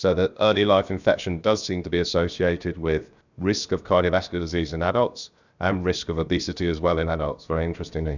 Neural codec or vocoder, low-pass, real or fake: codec, 16 kHz, 0.7 kbps, FocalCodec; 7.2 kHz; fake